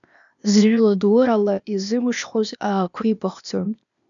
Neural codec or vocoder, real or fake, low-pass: codec, 16 kHz, 0.8 kbps, ZipCodec; fake; 7.2 kHz